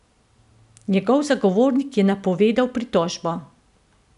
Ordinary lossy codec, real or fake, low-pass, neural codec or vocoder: none; real; 10.8 kHz; none